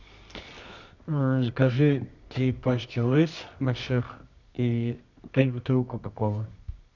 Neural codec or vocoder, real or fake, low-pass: codec, 24 kHz, 0.9 kbps, WavTokenizer, medium music audio release; fake; 7.2 kHz